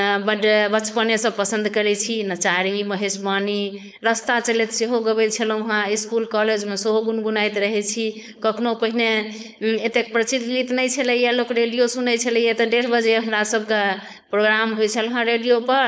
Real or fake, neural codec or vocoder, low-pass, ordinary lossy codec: fake; codec, 16 kHz, 4.8 kbps, FACodec; none; none